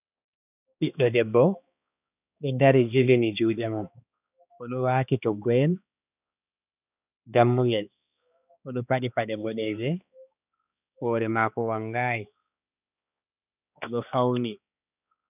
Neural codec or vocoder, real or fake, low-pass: codec, 16 kHz, 2 kbps, X-Codec, HuBERT features, trained on balanced general audio; fake; 3.6 kHz